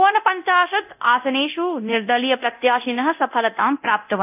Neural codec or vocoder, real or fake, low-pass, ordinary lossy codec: codec, 24 kHz, 0.9 kbps, DualCodec; fake; 3.6 kHz; AAC, 32 kbps